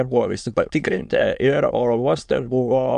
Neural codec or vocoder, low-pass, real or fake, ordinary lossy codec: autoencoder, 22.05 kHz, a latent of 192 numbers a frame, VITS, trained on many speakers; 9.9 kHz; fake; AAC, 96 kbps